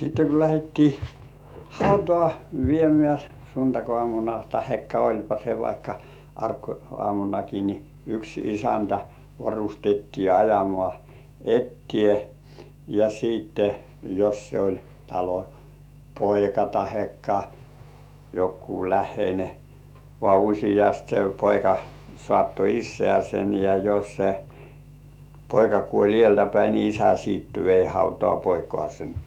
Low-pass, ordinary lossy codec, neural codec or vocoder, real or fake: 19.8 kHz; none; autoencoder, 48 kHz, 128 numbers a frame, DAC-VAE, trained on Japanese speech; fake